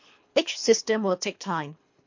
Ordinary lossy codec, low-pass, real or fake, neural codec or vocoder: MP3, 48 kbps; 7.2 kHz; fake; codec, 24 kHz, 3 kbps, HILCodec